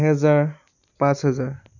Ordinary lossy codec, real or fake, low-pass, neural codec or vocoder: none; real; 7.2 kHz; none